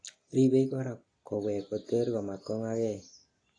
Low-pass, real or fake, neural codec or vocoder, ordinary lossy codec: 9.9 kHz; real; none; AAC, 32 kbps